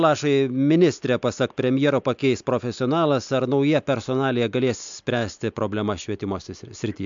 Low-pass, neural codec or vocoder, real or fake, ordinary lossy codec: 7.2 kHz; none; real; MP3, 64 kbps